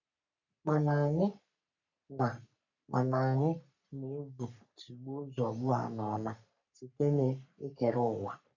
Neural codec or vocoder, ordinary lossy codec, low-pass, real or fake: codec, 44.1 kHz, 3.4 kbps, Pupu-Codec; none; 7.2 kHz; fake